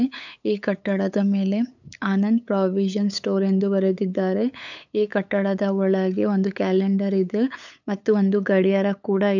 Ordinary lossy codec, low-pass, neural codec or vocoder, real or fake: none; 7.2 kHz; codec, 16 kHz, 8 kbps, FunCodec, trained on LibriTTS, 25 frames a second; fake